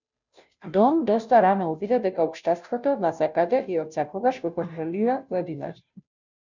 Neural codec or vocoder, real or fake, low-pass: codec, 16 kHz, 0.5 kbps, FunCodec, trained on Chinese and English, 25 frames a second; fake; 7.2 kHz